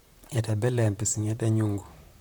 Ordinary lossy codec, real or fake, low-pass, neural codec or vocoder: none; fake; none; vocoder, 44.1 kHz, 128 mel bands, Pupu-Vocoder